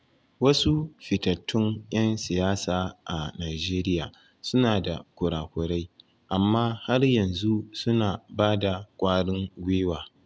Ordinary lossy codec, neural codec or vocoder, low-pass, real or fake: none; none; none; real